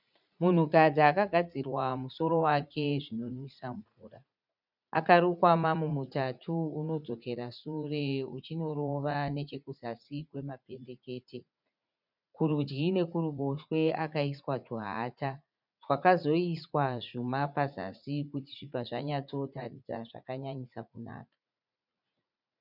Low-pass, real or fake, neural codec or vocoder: 5.4 kHz; fake; vocoder, 44.1 kHz, 80 mel bands, Vocos